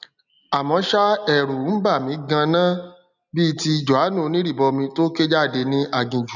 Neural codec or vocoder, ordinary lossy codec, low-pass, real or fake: none; none; 7.2 kHz; real